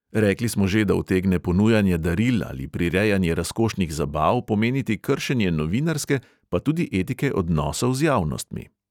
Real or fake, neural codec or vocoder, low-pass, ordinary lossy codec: real; none; 19.8 kHz; none